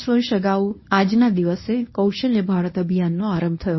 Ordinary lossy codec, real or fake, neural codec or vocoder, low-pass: MP3, 24 kbps; fake; codec, 24 kHz, 0.9 kbps, WavTokenizer, medium speech release version 1; 7.2 kHz